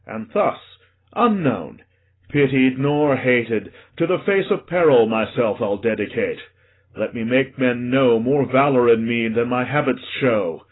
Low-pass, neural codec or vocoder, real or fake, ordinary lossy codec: 7.2 kHz; none; real; AAC, 16 kbps